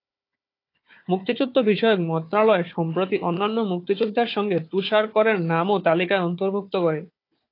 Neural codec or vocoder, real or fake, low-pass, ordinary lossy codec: codec, 16 kHz, 4 kbps, FunCodec, trained on Chinese and English, 50 frames a second; fake; 5.4 kHz; AAC, 32 kbps